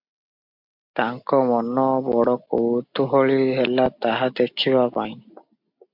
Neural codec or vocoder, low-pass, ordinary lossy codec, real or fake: none; 5.4 kHz; MP3, 48 kbps; real